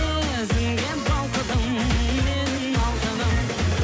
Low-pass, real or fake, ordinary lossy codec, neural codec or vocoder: none; real; none; none